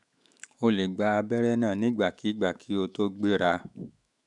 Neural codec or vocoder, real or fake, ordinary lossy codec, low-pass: autoencoder, 48 kHz, 128 numbers a frame, DAC-VAE, trained on Japanese speech; fake; none; 10.8 kHz